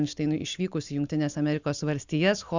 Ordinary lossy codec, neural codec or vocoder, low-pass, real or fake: Opus, 64 kbps; none; 7.2 kHz; real